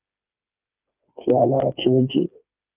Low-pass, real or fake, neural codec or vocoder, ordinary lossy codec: 3.6 kHz; fake; codec, 16 kHz, 4 kbps, FreqCodec, smaller model; Opus, 32 kbps